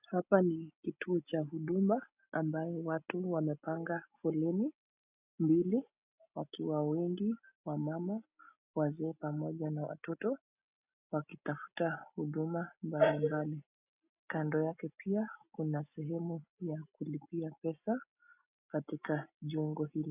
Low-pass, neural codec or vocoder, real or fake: 3.6 kHz; none; real